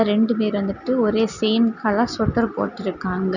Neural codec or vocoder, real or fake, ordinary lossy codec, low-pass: none; real; none; 7.2 kHz